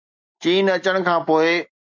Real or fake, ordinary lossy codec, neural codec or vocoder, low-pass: real; MP3, 64 kbps; none; 7.2 kHz